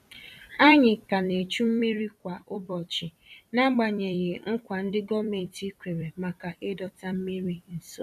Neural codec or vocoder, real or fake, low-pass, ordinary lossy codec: vocoder, 44.1 kHz, 128 mel bands every 256 samples, BigVGAN v2; fake; 14.4 kHz; none